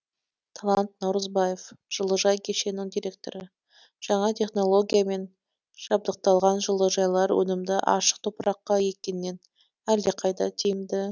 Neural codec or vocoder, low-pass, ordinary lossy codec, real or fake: none; 7.2 kHz; none; real